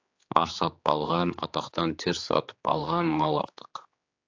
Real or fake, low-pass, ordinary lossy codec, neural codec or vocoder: fake; 7.2 kHz; AAC, 48 kbps; codec, 16 kHz, 4 kbps, X-Codec, HuBERT features, trained on general audio